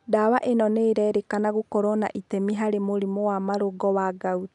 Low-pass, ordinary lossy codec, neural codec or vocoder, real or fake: 10.8 kHz; none; none; real